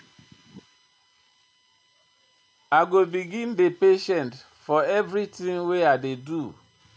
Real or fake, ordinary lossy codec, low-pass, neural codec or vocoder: real; none; none; none